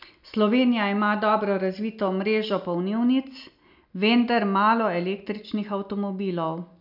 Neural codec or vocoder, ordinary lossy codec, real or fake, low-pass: none; none; real; 5.4 kHz